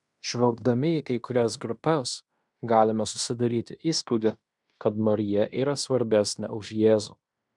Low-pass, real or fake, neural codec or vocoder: 10.8 kHz; fake; codec, 16 kHz in and 24 kHz out, 0.9 kbps, LongCat-Audio-Codec, fine tuned four codebook decoder